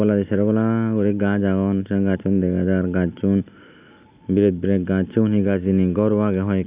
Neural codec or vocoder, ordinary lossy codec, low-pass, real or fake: none; Opus, 64 kbps; 3.6 kHz; real